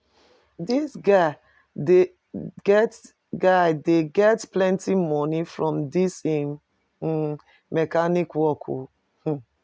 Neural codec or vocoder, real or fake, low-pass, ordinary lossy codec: none; real; none; none